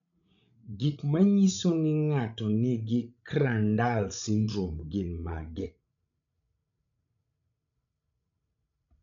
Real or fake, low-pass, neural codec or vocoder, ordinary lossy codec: fake; 7.2 kHz; codec, 16 kHz, 16 kbps, FreqCodec, larger model; none